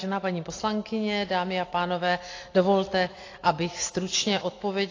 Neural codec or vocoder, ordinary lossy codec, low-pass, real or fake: none; AAC, 32 kbps; 7.2 kHz; real